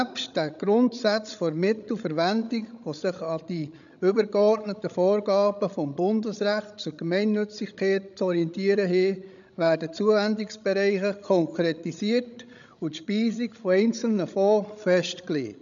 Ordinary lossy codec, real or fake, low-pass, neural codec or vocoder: none; fake; 7.2 kHz; codec, 16 kHz, 16 kbps, FreqCodec, larger model